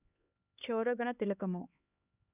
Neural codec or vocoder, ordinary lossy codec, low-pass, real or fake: codec, 16 kHz, 2 kbps, X-Codec, HuBERT features, trained on LibriSpeech; none; 3.6 kHz; fake